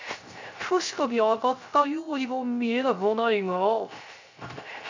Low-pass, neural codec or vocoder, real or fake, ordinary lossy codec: 7.2 kHz; codec, 16 kHz, 0.3 kbps, FocalCodec; fake; MP3, 64 kbps